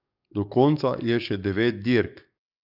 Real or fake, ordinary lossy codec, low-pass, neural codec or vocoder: fake; none; 5.4 kHz; codec, 44.1 kHz, 7.8 kbps, DAC